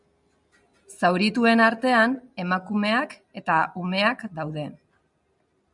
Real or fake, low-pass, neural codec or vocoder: real; 10.8 kHz; none